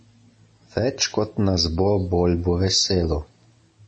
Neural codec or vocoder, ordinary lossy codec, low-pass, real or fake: none; MP3, 32 kbps; 9.9 kHz; real